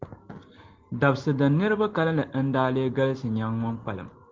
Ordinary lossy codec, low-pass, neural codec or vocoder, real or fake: Opus, 16 kbps; 7.2 kHz; none; real